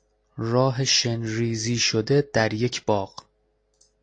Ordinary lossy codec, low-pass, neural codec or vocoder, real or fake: AAC, 48 kbps; 9.9 kHz; none; real